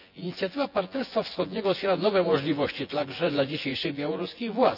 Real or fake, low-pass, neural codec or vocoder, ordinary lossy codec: fake; 5.4 kHz; vocoder, 24 kHz, 100 mel bands, Vocos; none